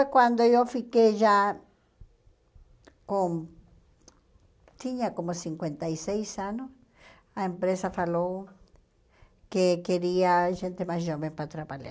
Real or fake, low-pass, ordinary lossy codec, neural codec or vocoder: real; none; none; none